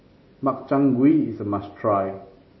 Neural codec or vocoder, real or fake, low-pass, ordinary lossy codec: none; real; 7.2 kHz; MP3, 24 kbps